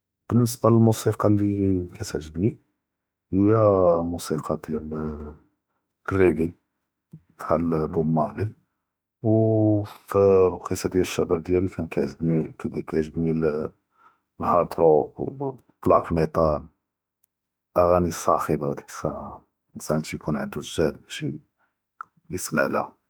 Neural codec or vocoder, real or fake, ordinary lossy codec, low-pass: autoencoder, 48 kHz, 32 numbers a frame, DAC-VAE, trained on Japanese speech; fake; none; none